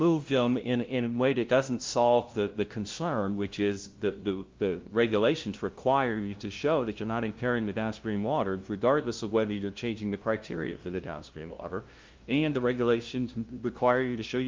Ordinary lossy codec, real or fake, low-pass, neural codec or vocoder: Opus, 32 kbps; fake; 7.2 kHz; codec, 16 kHz, 0.5 kbps, FunCodec, trained on LibriTTS, 25 frames a second